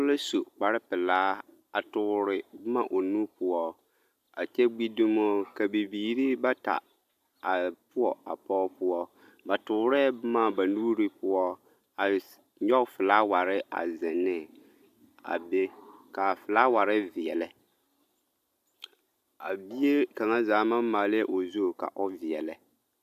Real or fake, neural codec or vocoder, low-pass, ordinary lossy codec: real; none; 14.4 kHz; AAC, 96 kbps